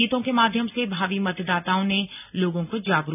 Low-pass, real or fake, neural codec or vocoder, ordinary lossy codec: 3.6 kHz; real; none; none